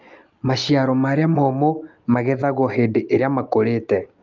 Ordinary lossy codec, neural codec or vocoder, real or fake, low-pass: Opus, 24 kbps; none; real; 7.2 kHz